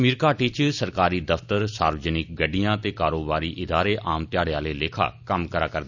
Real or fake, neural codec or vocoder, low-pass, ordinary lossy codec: real; none; 7.2 kHz; none